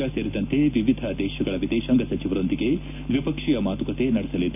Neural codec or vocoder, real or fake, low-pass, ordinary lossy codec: none; real; 3.6 kHz; none